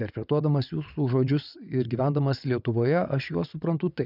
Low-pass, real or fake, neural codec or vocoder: 5.4 kHz; fake; vocoder, 22.05 kHz, 80 mel bands, WaveNeXt